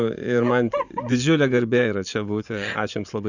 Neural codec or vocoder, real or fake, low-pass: vocoder, 24 kHz, 100 mel bands, Vocos; fake; 7.2 kHz